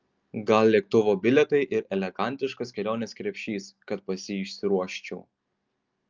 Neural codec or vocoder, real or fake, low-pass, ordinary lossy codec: none; real; 7.2 kHz; Opus, 24 kbps